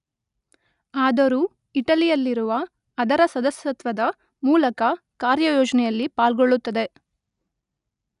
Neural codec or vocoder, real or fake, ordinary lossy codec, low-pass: none; real; none; 10.8 kHz